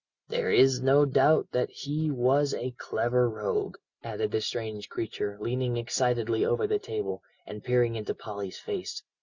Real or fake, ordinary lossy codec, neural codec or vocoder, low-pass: real; Opus, 64 kbps; none; 7.2 kHz